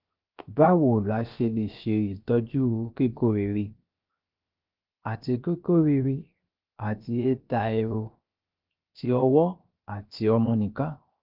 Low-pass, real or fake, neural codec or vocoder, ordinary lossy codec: 5.4 kHz; fake; codec, 16 kHz, 0.7 kbps, FocalCodec; Opus, 32 kbps